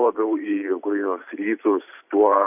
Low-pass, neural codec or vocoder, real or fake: 3.6 kHz; vocoder, 44.1 kHz, 128 mel bands every 512 samples, BigVGAN v2; fake